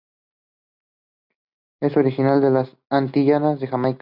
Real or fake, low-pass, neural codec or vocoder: real; 5.4 kHz; none